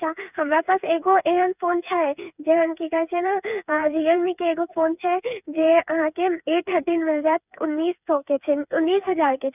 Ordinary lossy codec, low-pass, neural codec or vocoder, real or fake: none; 3.6 kHz; codec, 16 kHz, 4 kbps, FreqCodec, smaller model; fake